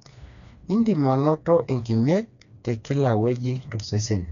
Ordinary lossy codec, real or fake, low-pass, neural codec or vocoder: none; fake; 7.2 kHz; codec, 16 kHz, 2 kbps, FreqCodec, smaller model